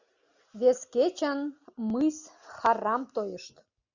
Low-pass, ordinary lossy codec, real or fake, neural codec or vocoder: 7.2 kHz; Opus, 64 kbps; real; none